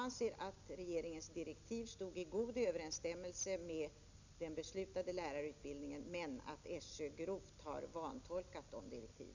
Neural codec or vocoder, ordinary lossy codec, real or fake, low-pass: none; none; real; 7.2 kHz